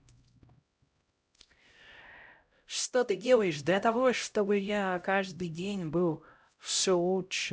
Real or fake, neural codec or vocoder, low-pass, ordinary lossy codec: fake; codec, 16 kHz, 0.5 kbps, X-Codec, HuBERT features, trained on LibriSpeech; none; none